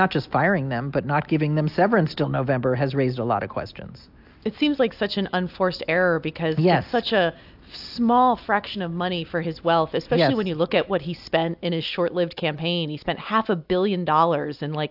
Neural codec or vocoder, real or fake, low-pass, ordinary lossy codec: none; real; 5.4 kHz; AAC, 48 kbps